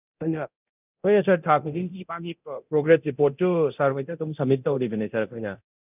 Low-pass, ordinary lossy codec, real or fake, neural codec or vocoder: 3.6 kHz; none; fake; codec, 24 kHz, 0.9 kbps, DualCodec